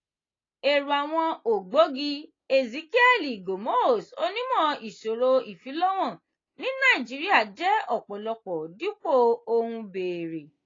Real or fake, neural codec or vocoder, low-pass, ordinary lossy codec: real; none; 7.2 kHz; AAC, 32 kbps